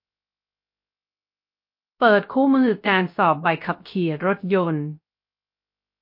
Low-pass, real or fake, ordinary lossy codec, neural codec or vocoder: 5.4 kHz; fake; MP3, 32 kbps; codec, 16 kHz, 0.3 kbps, FocalCodec